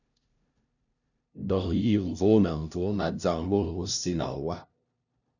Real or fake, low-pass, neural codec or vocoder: fake; 7.2 kHz; codec, 16 kHz, 0.5 kbps, FunCodec, trained on LibriTTS, 25 frames a second